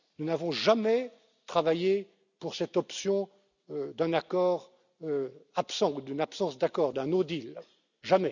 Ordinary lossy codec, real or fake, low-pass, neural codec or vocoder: none; real; 7.2 kHz; none